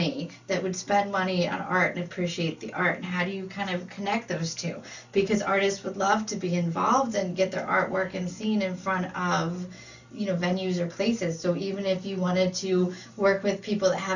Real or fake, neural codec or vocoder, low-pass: real; none; 7.2 kHz